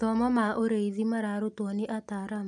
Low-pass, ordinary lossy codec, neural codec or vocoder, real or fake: 10.8 kHz; none; none; real